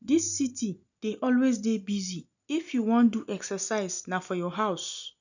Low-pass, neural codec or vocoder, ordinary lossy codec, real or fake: 7.2 kHz; none; none; real